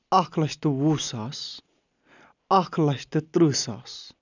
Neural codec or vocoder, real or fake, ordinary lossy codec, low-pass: none; real; none; 7.2 kHz